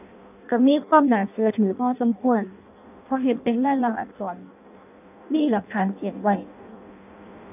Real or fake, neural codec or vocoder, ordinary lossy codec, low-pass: fake; codec, 16 kHz in and 24 kHz out, 0.6 kbps, FireRedTTS-2 codec; none; 3.6 kHz